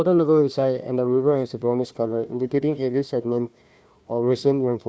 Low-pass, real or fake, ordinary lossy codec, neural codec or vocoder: none; fake; none; codec, 16 kHz, 1 kbps, FunCodec, trained on Chinese and English, 50 frames a second